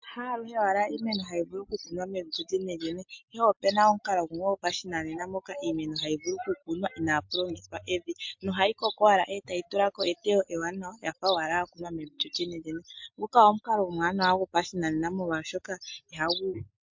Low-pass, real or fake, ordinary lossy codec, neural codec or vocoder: 7.2 kHz; real; MP3, 48 kbps; none